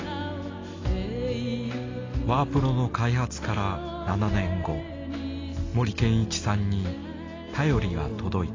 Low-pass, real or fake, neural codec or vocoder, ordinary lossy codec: 7.2 kHz; real; none; AAC, 32 kbps